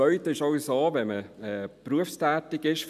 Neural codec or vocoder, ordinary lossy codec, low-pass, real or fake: none; none; 14.4 kHz; real